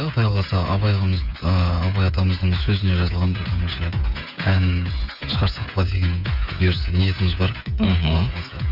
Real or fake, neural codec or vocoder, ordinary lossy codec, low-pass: fake; codec, 16 kHz, 8 kbps, FreqCodec, smaller model; none; 5.4 kHz